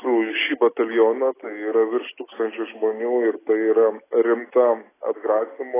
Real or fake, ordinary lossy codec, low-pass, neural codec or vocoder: real; AAC, 16 kbps; 3.6 kHz; none